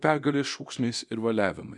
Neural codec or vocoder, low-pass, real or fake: codec, 24 kHz, 0.9 kbps, DualCodec; 10.8 kHz; fake